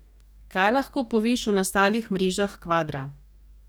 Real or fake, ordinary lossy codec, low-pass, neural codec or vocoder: fake; none; none; codec, 44.1 kHz, 2.6 kbps, DAC